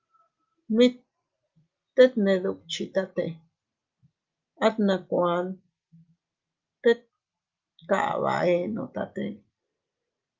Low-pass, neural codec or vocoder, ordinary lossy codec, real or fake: 7.2 kHz; none; Opus, 24 kbps; real